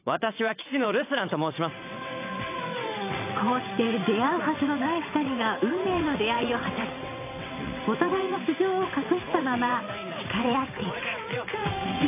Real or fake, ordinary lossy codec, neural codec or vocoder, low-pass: fake; AAC, 24 kbps; vocoder, 22.05 kHz, 80 mel bands, WaveNeXt; 3.6 kHz